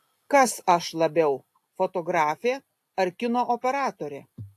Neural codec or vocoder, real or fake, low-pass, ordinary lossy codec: none; real; 14.4 kHz; AAC, 64 kbps